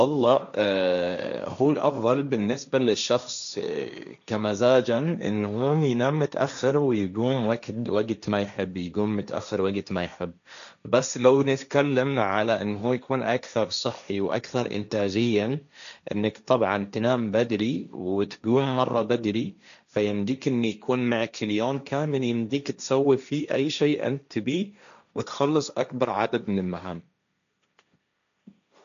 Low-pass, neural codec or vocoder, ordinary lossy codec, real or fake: 7.2 kHz; codec, 16 kHz, 1.1 kbps, Voila-Tokenizer; none; fake